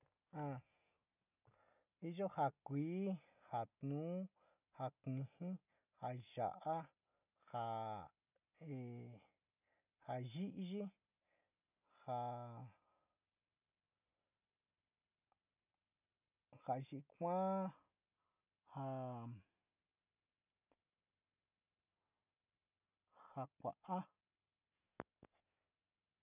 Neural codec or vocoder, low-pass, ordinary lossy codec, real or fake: none; 3.6 kHz; none; real